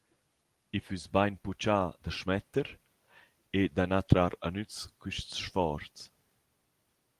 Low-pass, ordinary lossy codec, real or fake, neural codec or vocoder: 14.4 kHz; Opus, 24 kbps; real; none